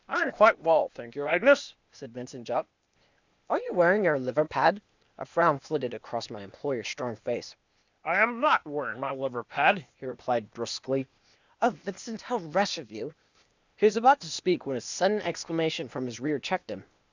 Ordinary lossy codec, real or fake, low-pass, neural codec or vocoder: Opus, 64 kbps; fake; 7.2 kHz; codec, 16 kHz, 0.8 kbps, ZipCodec